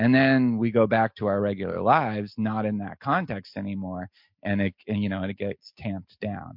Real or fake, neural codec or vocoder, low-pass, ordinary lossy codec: real; none; 5.4 kHz; MP3, 48 kbps